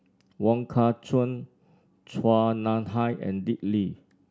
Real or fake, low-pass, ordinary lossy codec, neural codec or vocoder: real; none; none; none